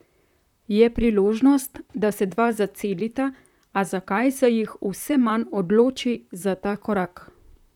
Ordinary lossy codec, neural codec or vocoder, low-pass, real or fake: none; vocoder, 44.1 kHz, 128 mel bands, Pupu-Vocoder; 19.8 kHz; fake